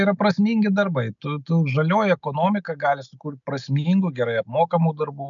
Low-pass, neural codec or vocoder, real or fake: 7.2 kHz; none; real